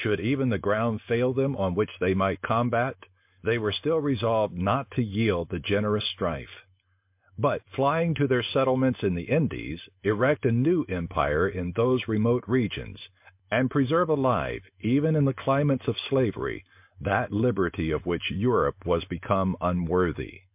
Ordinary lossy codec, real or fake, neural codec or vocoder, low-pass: MP3, 32 kbps; real; none; 3.6 kHz